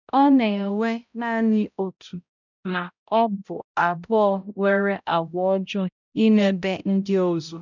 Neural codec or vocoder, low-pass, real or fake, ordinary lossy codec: codec, 16 kHz, 0.5 kbps, X-Codec, HuBERT features, trained on balanced general audio; 7.2 kHz; fake; none